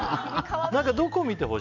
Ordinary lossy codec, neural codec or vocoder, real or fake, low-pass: none; none; real; 7.2 kHz